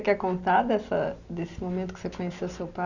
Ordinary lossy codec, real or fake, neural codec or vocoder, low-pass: none; real; none; 7.2 kHz